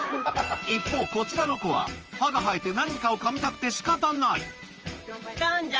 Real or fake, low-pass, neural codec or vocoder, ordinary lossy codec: fake; 7.2 kHz; vocoder, 44.1 kHz, 128 mel bands, Pupu-Vocoder; Opus, 24 kbps